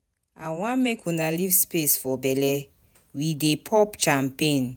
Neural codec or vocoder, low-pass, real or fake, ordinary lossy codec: vocoder, 48 kHz, 128 mel bands, Vocos; none; fake; none